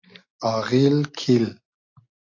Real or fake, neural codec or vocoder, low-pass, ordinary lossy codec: real; none; 7.2 kHz; AAC, 48 kbps